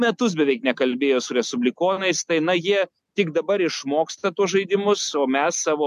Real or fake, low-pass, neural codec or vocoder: real; 14.4 kHz; none